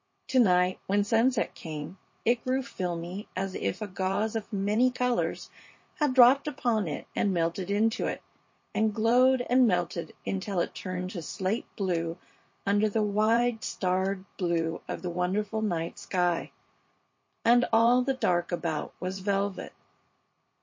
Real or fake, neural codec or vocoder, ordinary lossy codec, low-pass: fake; vocoder, 44.1 kHz, 80 mel bands, Vocos; MP3, 32 kbps; 7.2 kHz